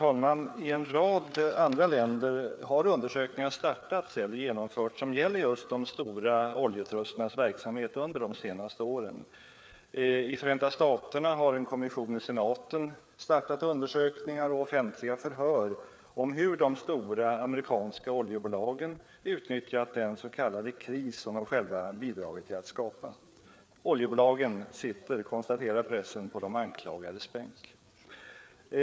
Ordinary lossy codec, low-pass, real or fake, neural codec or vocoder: none; none; fake; codec, 16 kHz, 4 kbps, FreqCodec, larger model